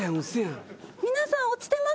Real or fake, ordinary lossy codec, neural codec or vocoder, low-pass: real; none; none; none